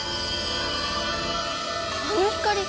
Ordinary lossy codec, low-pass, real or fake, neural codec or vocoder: none; none; real; none